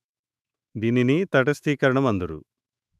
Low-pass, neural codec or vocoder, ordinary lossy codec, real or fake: 14.4 kHz; autoencoder, 48 kHz, 128 numbers a frame, DAC-VAE, trained on Japanese speech; none; fake